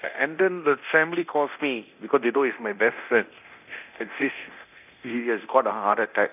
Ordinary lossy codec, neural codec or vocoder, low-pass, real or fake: none; codec, 24 kHz, 0.9 kbps, DualCodec; 3.6 kHz; fake